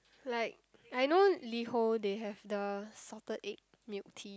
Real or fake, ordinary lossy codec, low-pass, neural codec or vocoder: real; none; none; none